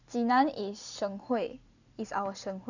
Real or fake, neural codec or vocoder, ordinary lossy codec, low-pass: real; none; none; 7.2 kHz